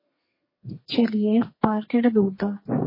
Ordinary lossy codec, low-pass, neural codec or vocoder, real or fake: MP3, 24 kbps; 5.4 kHz; codec, 32 kHz, 1.9 kbps, SNAC; fake